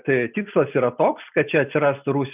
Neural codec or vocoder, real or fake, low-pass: none; real; 3.6 kHz